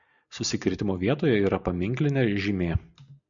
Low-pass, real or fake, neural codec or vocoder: 7.2 kHz; real; none